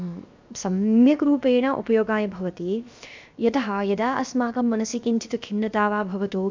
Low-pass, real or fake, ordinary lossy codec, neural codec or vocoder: 7.2 kHz; fake; MP3, 64 kbps; codec, 16 kHz, 0.7 kbps, FocalCodec